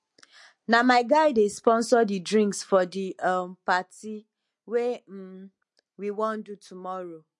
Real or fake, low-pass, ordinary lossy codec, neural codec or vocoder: real; 10.8 kHz; MP3, 48 kbps; none